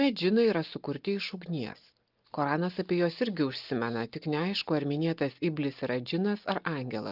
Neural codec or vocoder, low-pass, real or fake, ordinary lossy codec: none; 5.4 kHz; real; Opus, 24 kbps